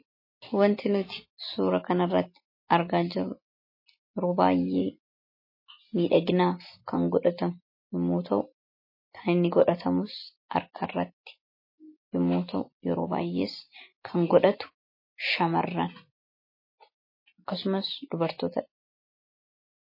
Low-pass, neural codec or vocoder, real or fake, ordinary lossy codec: 5.4 kHz; none; real; MP3, 24 kbps